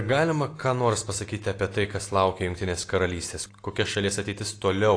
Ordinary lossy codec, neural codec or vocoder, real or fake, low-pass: AAC, 48 kbps; none; real; 9.9 kHz